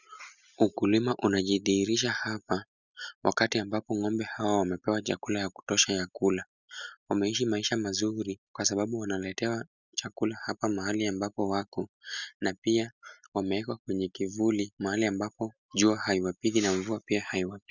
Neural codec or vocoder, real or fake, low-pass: none; real; 7.2 kHz